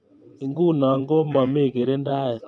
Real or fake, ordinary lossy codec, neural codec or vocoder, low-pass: fake; none; vocoder, 22.05 kHz, 80 mel bands, WaveNeXt; none